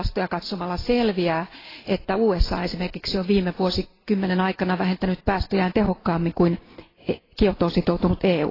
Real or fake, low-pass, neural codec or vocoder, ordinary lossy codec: real; 5.4 kHz; none; AAC, 24 kbps